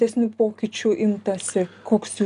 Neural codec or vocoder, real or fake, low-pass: none; real; 10.8 kHz